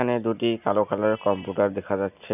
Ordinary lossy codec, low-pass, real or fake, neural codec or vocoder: none; 3.6 kHz; real; none